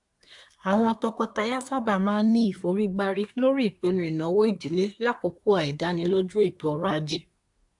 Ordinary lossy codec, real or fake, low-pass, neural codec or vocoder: AAC, 64 kbps; fake; 10.8 kHz; codec, 24 kHz, 1 kbps, SNAC